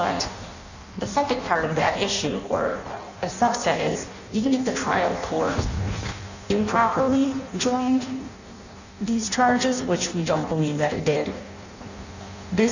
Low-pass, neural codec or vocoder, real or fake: 7.2 kHz; codec, 16 kHz in and 24 kHz out, 0.6 kbps, FireRedTTS-2 codec; fake